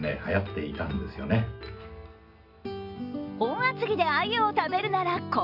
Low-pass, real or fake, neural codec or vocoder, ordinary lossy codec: 5.4 kHz; real; none; Opus, 64 kbps